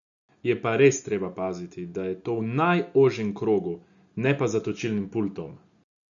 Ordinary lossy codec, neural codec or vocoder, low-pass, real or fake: none; none; 7.2 kHz; real